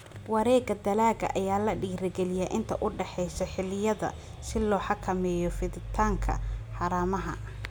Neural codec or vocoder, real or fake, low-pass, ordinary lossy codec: none; real; none; none